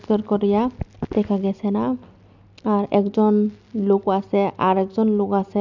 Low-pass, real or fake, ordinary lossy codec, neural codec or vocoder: 7.2 kHz; real; none; none